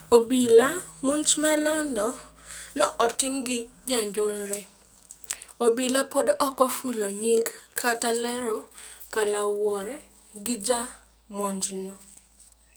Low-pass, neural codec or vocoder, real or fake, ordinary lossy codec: none; codec, 44.1 kHz, 2.6 kbps, SNAC; fake; none